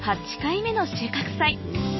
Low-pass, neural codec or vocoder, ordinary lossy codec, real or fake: 7.2 kHz; none; MP3, 24 kbps; real